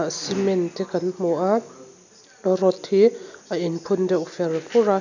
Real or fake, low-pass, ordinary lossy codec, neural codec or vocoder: real; 7.2 kHz; none; none